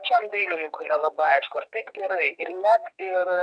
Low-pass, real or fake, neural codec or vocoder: 9.9 kHz; fake; codec, 32 kHz, 1.9 kbps, SNAC